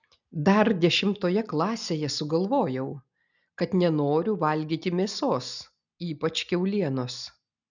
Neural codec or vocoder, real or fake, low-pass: none; real; 7.2 kHz